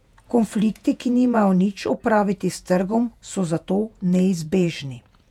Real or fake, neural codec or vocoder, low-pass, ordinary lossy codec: fake; vocoder, 48 kHz, 128 mel bands, Vocos; 19.8 kHz; none